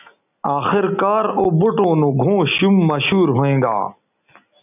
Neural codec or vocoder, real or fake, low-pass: none; real; 3.6 kHz